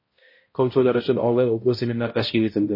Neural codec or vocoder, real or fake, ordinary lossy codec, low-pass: codec, 16 kHz, 0.5 kbps, X-Codec, HuBERT features, trained on balanced general audio; fake; MP3, 24 kbps; 5.4 kHz